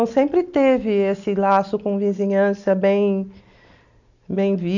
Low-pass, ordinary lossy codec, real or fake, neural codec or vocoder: 7.2 kHz; none; real; none